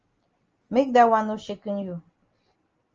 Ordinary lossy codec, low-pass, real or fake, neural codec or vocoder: Opus, 24 kbps; 7.2 kHz; real; none